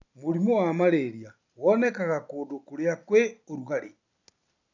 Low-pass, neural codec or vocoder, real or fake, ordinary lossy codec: 7.2 kHz; none; real; none